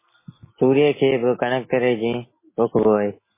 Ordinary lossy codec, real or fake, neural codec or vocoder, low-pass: MP3, 16 kbps; real; none; 3.6 kHz